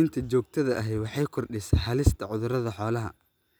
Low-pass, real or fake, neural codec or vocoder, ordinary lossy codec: none; real; none; none